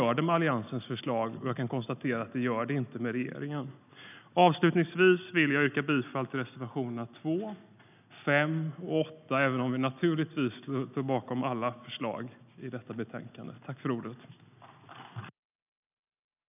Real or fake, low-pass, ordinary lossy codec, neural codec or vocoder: real; 3.6 kHz; none; none